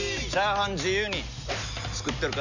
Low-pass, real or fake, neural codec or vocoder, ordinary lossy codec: 7.2 kHz; real; none; none